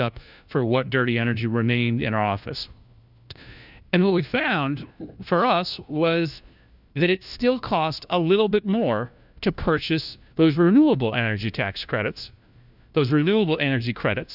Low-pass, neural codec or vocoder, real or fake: 5.4 kHz; codec, 16 kHz, 1 kbps, FunCodec, trained on LibriTTS, 50 frames a second; fake